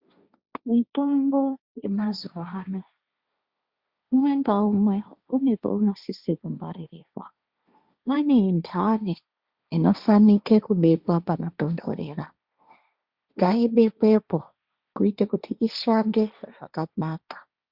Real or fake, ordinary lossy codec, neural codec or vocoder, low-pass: fake; Opus, 64 kbps; codec, 16 kHz, 1.1 kbps, Voila-Tokenizer; 5.4 kHz